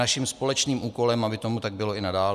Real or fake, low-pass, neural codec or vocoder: real; 14.4 kHz; none